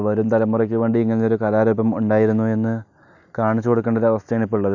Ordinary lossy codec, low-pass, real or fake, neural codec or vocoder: none; 7.2 kHz; real; none